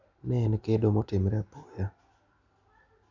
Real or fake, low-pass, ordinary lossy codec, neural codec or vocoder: real; 7.2 kHz; none; none